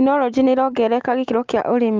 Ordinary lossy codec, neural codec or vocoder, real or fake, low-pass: Opus, 32 kbps; none; real; 7.2 kHz